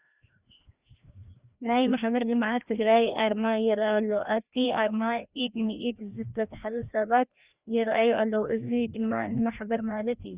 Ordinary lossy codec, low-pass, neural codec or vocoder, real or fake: Opus, 64 kbps; 3.6 kHz; codec, 16 kHz, 1 kbps, FreqCodec, larger model; fake